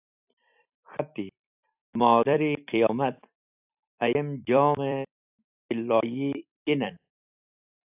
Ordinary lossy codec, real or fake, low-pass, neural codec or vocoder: AAC, 32 kbps; fake; 3.6 kHz; vocoder, 44.1 kHz, 128 mel bands every 256 samples, BigVGAN v2